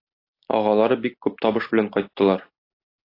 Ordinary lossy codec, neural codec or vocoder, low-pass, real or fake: AAC, 32 kbps; none; 5.4 kHz; real